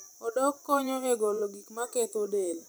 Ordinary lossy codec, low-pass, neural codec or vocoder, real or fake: none; none; vocoder, 44.1 kHz, 128 mel bands every 512 samples, BigVGAN v2; fake